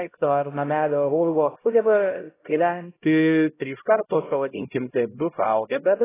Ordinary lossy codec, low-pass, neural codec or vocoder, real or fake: AAC, 16 kbps; 3.6 kHz; codec, 16 kHz, 0.5 kbps, X-Codec, HuBERT features, trained on LibriSpeech; fake